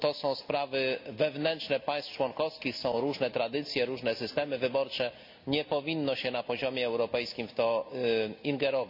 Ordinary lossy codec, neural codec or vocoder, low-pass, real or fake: MP3, 48 kbps; none; 5.4 kHz; real